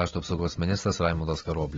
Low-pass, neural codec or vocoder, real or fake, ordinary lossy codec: 7.2 kHz; none; real; AAC, 24 kbps